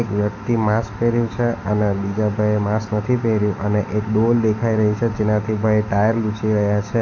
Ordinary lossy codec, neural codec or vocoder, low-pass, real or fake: AAC, 48 kbps; none; 7.2 kHz; real